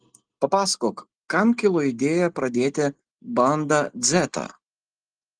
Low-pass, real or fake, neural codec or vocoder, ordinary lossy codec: 9.9 kHz; real; none; Opus, 24 kbps